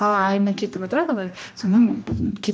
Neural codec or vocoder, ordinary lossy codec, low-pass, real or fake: codec, 16 kHz, 1 kbps, X-Codec, HuBERT features, trained on general audio; none; none; fake